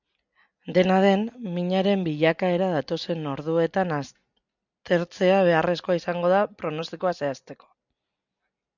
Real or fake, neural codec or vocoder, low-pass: real; none; 7.2 kHz